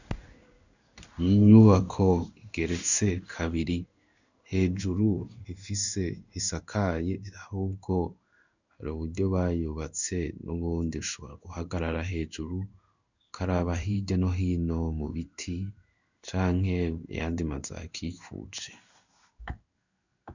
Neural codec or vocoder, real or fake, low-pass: codec, 16 kHz in and 24 kHz out, 1 kbps, XY-Tokenizer; fake; 7.2 kHz